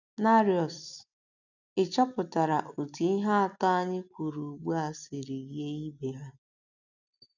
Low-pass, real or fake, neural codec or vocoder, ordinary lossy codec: 7.2 kHz; real; none; none